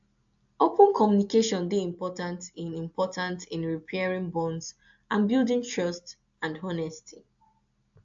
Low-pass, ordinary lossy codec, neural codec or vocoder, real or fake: 7.2 kHz; AAC, 64 kbps; none; real